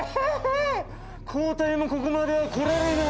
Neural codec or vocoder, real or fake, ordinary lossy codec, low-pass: none; real; none; none